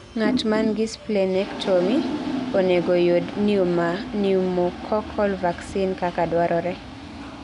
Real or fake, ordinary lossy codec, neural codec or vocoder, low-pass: real; none; none; 10.8 kHz